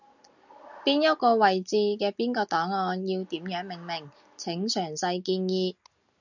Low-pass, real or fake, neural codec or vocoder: 7.2 kHz; real; none